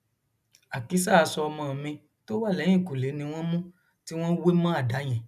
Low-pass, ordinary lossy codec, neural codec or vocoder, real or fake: 14.4 kHz; none; none; real